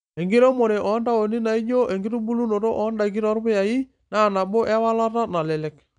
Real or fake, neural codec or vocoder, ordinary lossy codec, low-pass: real; none; none; 9.9 kHz